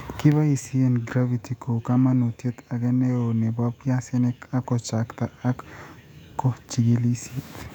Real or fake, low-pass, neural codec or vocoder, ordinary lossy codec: fake; 19.8 kHz; autoencoder, 48 kHz, 128 numbers a frame, DAC-VAE, trained on Japanese speech; none